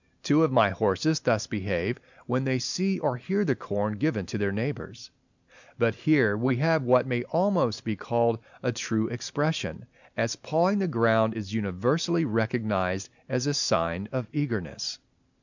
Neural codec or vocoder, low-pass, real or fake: none; 7.2 kHz; real